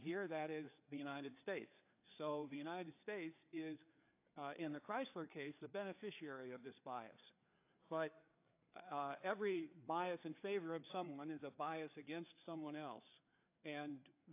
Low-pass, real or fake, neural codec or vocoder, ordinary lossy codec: 3.6 kHz; fake; codec, 16 kHz, 4 kbps, FreqCodec, larger model; AAC, 24 kbps